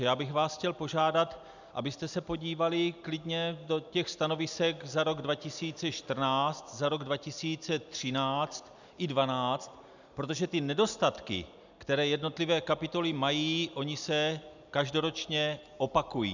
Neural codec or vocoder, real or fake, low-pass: none; real; 7.2 kHz